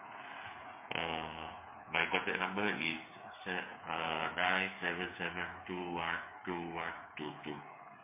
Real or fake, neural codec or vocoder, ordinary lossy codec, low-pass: fake; codec, 16 kHz, 8 kbps, FreqCodec, smaller model; MP3, 24 kbps; 3.6 kHz